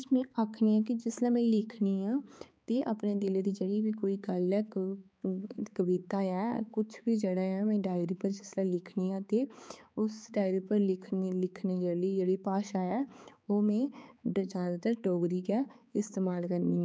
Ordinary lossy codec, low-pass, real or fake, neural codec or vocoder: none; none; fake; codec, 16 kHz, 4 kbps, X-Codec, HuBERT features, trained on balanced general audio